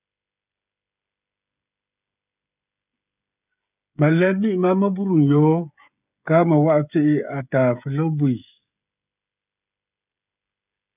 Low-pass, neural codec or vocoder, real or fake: 3.6 kHz; codec, 16 kHz, 16 kbps, FreqCodec, smaller model; fake